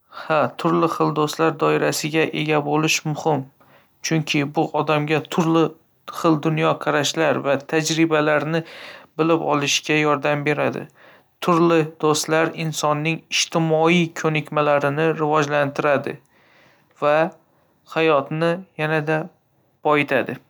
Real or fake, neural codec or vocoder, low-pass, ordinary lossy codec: real; none; none; none